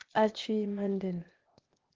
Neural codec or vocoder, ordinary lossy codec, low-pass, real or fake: codec, 16 kHz, 0.8 kbps, ZipCodec; Opus, 16 kbps; 7.2 kHz; fake